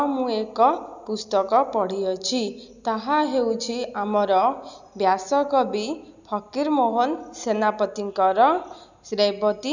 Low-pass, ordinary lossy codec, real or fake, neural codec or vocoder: 7.2 kHz; none; real; none